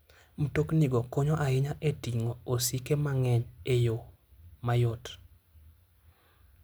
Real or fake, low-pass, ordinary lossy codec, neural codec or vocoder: real; none; none; none